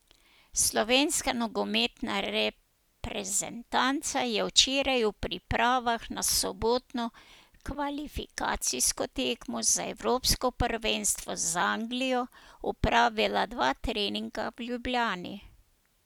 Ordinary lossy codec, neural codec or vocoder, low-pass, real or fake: none; none; none; real